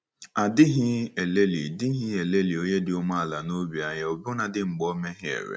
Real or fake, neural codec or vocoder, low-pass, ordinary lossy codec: real; none; none; none